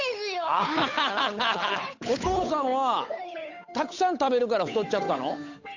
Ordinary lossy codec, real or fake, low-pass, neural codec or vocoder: AAC, 48 kbps; fake; 7.2 kHz; codec, 16 kHz, 8 kbps, FunCodec, trained on Chinese and English, 25 frames a second